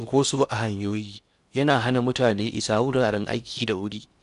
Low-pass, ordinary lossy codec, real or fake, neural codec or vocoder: 10.8 kHz; none; fake; codec, 16 kHz in and 24 kHz out, 0.8 kbps, FocalCodec, streaming, 65536 codes